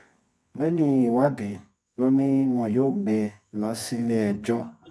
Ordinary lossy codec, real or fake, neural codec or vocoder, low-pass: none; fake; codec, 24 kHz, 0.9 kbps, WavTokenizer, medium music audio release; none